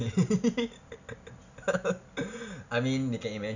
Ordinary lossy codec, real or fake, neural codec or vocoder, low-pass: none; real; none; 7.2 kHz